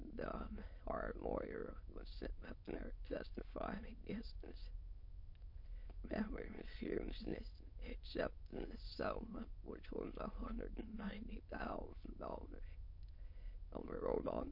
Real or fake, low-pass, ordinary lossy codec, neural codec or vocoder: fake; 5.4 kHz; MP3, 32 kbps; autoencoder, 22.05 kHz, a latent of 192 numbers a frame, VITS, trained on many speakers